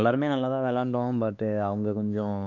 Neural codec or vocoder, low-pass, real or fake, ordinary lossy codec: codec, 16 kHz, 2 kbps, X-Codec, WavLM features, trained on Multilingual LibriSpeech; 7.2 kHz; fake; none